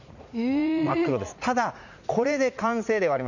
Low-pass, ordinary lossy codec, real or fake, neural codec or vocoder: 7.2 kHz; none; fake; vocoder, 44.1 kHz, 80 mel bands, Vocos